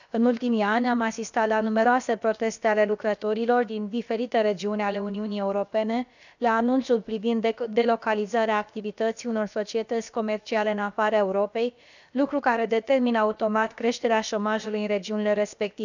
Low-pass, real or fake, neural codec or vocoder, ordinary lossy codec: 7.2 kHz; fake; codec, 16 kHz, about 1 kbps, DyCAST, with the encoder's durations; none